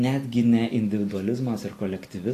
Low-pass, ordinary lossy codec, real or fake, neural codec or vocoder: 14.4 kHz; AAC, 48 kbps; real; none